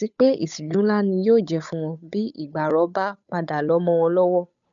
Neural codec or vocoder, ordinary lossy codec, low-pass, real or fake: codec, 16 kHz, 8 kbps, FunCodec, trained on Chinese and English, 25 frames a second; none; 7.2 kHz; fake